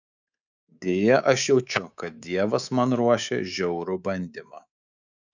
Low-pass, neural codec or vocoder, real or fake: 7.2 kHz; codec, 24 kHz, 3.1 kbps, DualCodec; fake